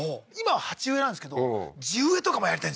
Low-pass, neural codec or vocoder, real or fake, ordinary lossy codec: none; none; real; none